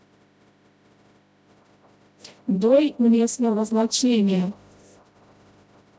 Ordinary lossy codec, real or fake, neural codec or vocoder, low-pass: none; fake; codec, 16 kHz, 0.5 kbps, FreqCodec, smaller model; none